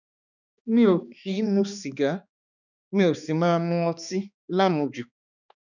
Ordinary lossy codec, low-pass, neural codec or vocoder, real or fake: none; 7.2 kHz; codec, 16 kHz, 2 kbps, X-Codec, HuBERT features, trained on balanced general audio; fake